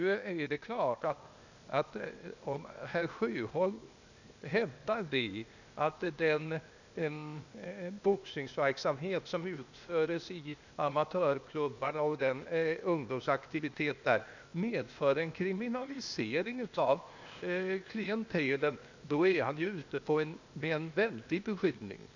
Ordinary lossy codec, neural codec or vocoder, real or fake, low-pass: none; codec, 16 kHz, 0.8 kbps, ZipCodec; fake; 7.2 kHz